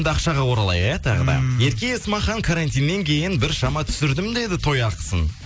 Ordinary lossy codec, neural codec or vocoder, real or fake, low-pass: none; none; real; none